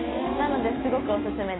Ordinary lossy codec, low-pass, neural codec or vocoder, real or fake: AAC, 16 kbps; 7.2 kHz; none; real